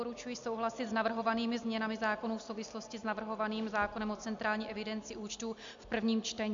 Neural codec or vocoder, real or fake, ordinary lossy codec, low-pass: none; real; MP3, 64 kbps; 7.2 kHz